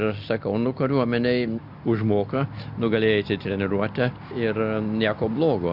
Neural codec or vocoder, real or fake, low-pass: none; real; 5.4 kHz